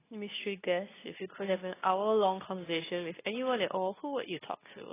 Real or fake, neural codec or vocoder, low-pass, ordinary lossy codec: fake; codec, 24 kHz, 0.9 kbps, WavTokenizer, medium speech release version 2; 3.6 kHz; AAC, 16 kbps